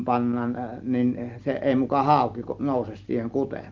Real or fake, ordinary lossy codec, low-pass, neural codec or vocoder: real; Opus, 24 kbps; 7.2 kHz; none